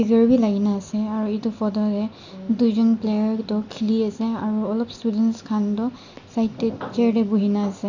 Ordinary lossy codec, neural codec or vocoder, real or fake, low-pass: none; none; real; 7.2 kHz